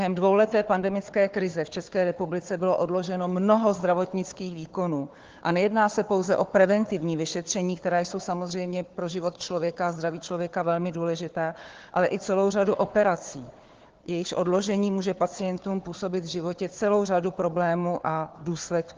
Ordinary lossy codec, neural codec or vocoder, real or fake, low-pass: Opus, 16 kbps; codec, 16 kHz, 4 kbps, FunCodec, trained on Chinese and English, 50 frames a second; fake; 7.2 kHz